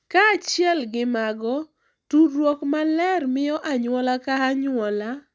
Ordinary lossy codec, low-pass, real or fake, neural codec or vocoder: none; none; real; none